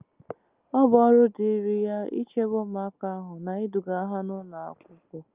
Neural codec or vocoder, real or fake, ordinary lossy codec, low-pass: none; real; Opus, 24 kbps; 3.6 kHz